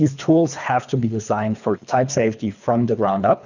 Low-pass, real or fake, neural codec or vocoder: 7.2 kHz; fake; codec, 24 kHz, 3 kbps, HILCodec